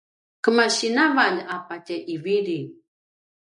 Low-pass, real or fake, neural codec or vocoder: 10.8 kHz; real; none